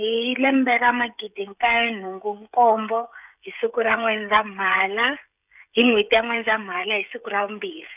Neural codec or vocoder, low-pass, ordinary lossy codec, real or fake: codec, 16 kHz, 16 kbps, FreqCodec, smaller model; 3.6 kHz; none; fake